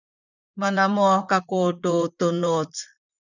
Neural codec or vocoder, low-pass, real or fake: vocoder, 44.1 kHz, 128 mel bands, Pupu-Vocoder; 7.2 kHz; fake